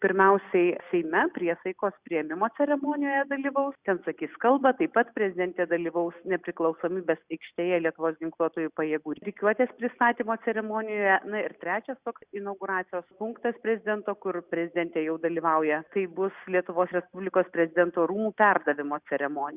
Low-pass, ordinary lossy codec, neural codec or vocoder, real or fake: 3.6 kHz; Opus, 64 kbps; none; real